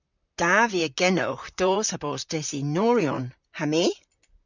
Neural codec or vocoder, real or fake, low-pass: vocoder, 44.1 kHz, 128 mel bands, Pupu-Vocoder; fake; 7.2 kHz